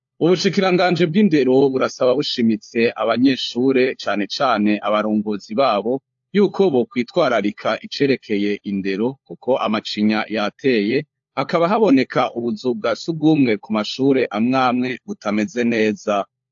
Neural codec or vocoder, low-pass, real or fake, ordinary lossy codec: codec, 16 kHz, 4 kbps, FunCodec, trained on LibriTTS, 50 frames a second; 7.2 kHz; fake; AAC, 64 kbps